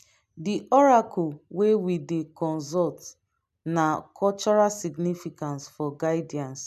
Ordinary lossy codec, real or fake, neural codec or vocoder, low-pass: none; real; none; 14.4 kHz